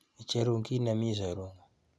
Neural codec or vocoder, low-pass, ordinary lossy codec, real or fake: none; none; none; real